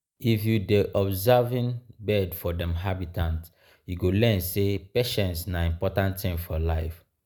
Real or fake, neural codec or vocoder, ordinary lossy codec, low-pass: real; none; none; none